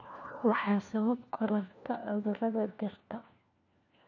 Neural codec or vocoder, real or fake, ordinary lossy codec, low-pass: codec, 16 kHz, 1 kbps, FunCodec, trained on LibriTTS, 50 frames a second; fake; none; 7.2 kHz